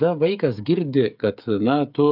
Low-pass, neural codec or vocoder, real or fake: 5.4 kHz; codec, 16 kHz, 8 kbps, FreqCodec, smaller model; fake